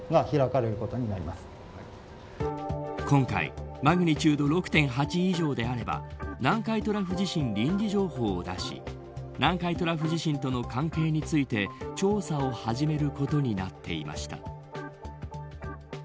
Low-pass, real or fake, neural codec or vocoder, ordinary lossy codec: none; real; none; none